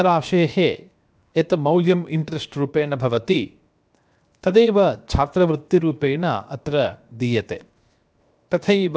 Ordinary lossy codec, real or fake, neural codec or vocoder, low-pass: none; fake; codec, 16 kHz, 0.7 kbps, FocalCodec; none